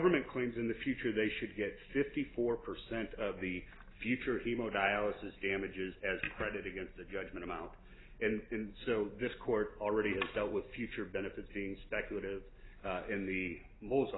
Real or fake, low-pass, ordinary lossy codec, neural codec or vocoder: real; 7.2 kHz; AAC, 16 kbps; none